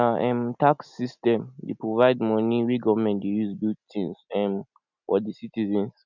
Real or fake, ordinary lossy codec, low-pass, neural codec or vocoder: real; none; 7.2 kHz; none